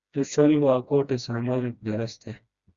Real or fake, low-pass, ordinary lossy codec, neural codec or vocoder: fake; 7.2 kHz; Opus, 64 kbps; codec, 16 kHz, 1 kbps, FreqCodec, smaller model